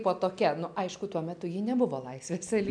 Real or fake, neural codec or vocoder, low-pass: real; none; 9.9 kHz